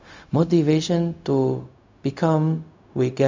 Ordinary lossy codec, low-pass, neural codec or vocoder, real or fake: MP3, 64 kbps; 7.2 kHz; codec, 16 kHz, 0.4 kbps, LongCat-Audio-Codec; fake